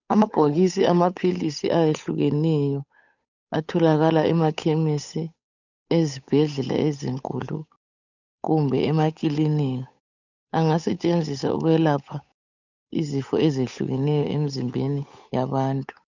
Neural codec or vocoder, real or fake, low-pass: codec, 16 kHz, 8 kbps, FunCodec, trained on Chinese and English, 25 frames a second; fake; 7.2 kHz